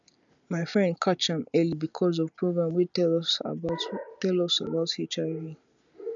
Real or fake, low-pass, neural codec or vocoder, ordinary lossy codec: real; 7.2 kHz; none; none